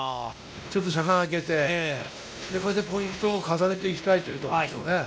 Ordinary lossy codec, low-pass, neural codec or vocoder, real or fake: none; none; codec, 16 kHz, 1 kbps, X-Codec, WavLM features, trained on Multilingual LibriSpeech; fake